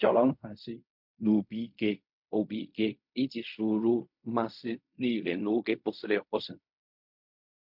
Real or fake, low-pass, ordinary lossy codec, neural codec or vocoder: fake; 5.4 kHz; MP3, 48 kbps; codec, 16 kHz in and 24 kHz out, 0.4 kbps, LongCat-Audio-Codec, fine tuned four codebook decoder